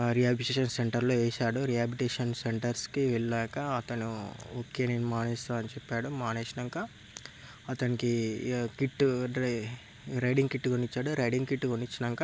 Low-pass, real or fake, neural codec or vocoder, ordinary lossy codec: none; real; none; none